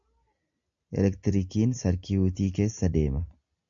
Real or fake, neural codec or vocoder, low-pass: real; none; 7.2 kHz